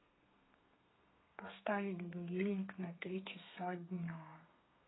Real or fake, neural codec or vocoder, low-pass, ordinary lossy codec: fake; codec, 32 kHz, 1.9 kbps, SNAC; 7.2 kHz; AAC, 16 kbps